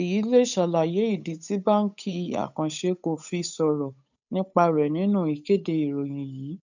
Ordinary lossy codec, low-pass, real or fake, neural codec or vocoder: none; 7.2 kHz; fake; codec, 16 kHz, 8 kbps, FunCodec, trained on Chinese and English, 25 frames a second